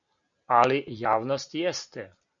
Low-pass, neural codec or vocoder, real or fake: 7.2 kHz; none; real